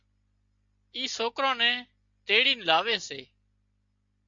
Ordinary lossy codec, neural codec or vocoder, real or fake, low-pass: AAC, 64 kbps; none; real; 7.2 kHz